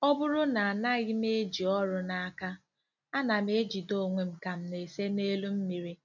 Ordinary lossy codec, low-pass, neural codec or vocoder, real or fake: none; 7.2 kHz; none; real